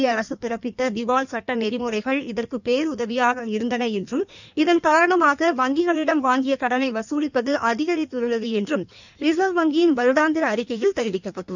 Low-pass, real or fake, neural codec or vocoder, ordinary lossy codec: 7.2 kHz; fake; codec, 16 kHz in and 24 kHz out, 1.1 kbps, FireRedTTS-2 codec; none